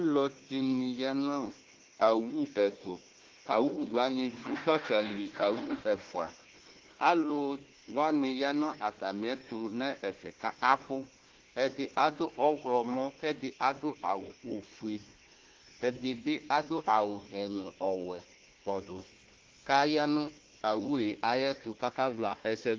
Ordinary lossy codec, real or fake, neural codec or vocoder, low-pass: Opus, 16 kbps; fake; codec, 16 kHz, 1 kbps, FunCodec, trained on Chinese and English, 50 frames a second; 7.2 kHz